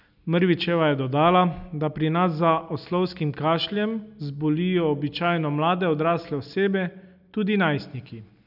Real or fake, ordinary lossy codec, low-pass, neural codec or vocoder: real; none; 5.4 kHz; none